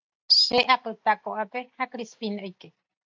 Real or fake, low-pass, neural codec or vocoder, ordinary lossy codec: real; 7.2 kHz; none; AAC, 48 kbps